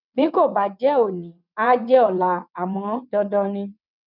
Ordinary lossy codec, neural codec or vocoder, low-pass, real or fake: none; vocoder, 22.05 kHz, 80 mel bands, WaveNeXt; 5.4 kHz; fake